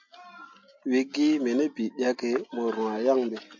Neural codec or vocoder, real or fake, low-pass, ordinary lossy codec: none; real; 7.2 kHz; MP3, 48 kbps